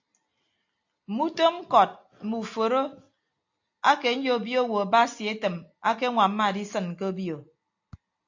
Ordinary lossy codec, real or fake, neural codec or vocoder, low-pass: AAC, 48 kbps; real; none; 7.2 kHz